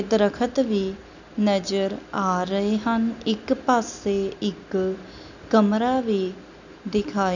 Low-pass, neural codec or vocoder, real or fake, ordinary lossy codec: 7.2 kHz; none; real; none